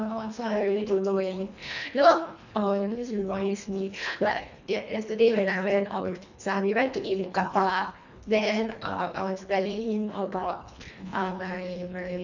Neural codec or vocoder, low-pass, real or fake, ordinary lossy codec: codec, 24 kHz, 1.5 kbps, HILCodec; 7.2 kHz; fake; none